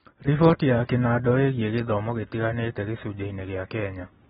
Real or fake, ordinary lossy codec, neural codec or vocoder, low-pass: real; AAC, 16 kbps; none; 19.8 kHz